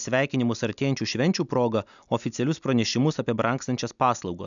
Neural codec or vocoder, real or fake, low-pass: none; real; 7.2 kHz